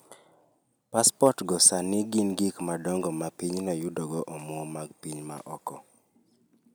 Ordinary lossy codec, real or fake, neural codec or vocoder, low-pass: none; real; none; none